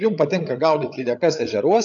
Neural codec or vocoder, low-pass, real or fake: codec, 16 kHz, 8 kbps, FreqCodec, larger model; 7.2 kHz; fake